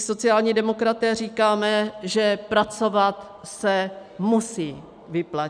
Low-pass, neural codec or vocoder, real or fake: 9.9 kHz; none; real